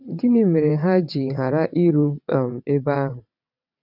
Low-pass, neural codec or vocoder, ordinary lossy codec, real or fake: 5.4 kHz; vocoder, 22.05 kHz, 80 mel bands, WaveNeXt; none; fake